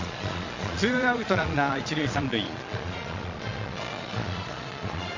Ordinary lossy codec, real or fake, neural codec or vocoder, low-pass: MP3, 48 kbps; fake; vocoder, 22.05 kHz, 80 mel bands, Vocos; 7.2 kHz